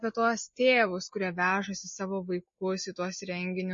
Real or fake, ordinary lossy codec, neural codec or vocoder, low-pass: real; MP3, 32 kbps; none; 7.2 kHz